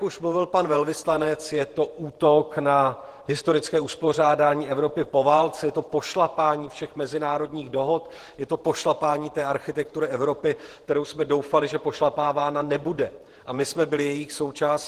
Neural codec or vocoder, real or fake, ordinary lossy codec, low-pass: vocoder, 48 kHz, 128 mel bands, Vocos; fake; Opus, 16 kbps; 14.4 kHz